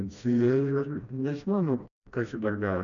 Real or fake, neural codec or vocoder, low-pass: fake; codec, 16 kHz, 1 kbps, FreqCodec, smaller model; 7.2 kHz